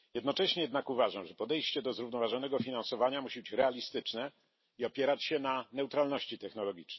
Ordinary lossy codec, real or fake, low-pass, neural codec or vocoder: MP3, 24 kbps; real; 7.2 kHz; none